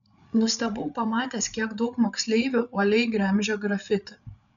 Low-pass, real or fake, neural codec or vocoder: 7.2 kHz; fake; codec, 16 kHz, 8 kbps, FreqCodec, larger model